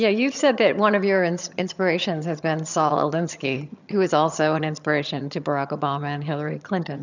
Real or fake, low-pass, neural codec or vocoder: fake; 7.2 kHz; vocoder, 22.05 kHz, 80 mel bands, HiFi-GAN